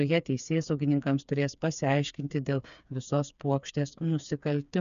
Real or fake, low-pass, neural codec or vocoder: fake; 7.2 kHz; codec, 16 kHz, 4 kbps, FreqCodec, smaller model